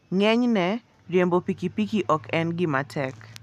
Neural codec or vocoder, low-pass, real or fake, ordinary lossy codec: none; 14.4 kHz; real; none